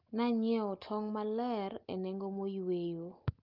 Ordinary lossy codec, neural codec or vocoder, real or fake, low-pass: Opus, 24 kbps; none; real; 5.4 kHz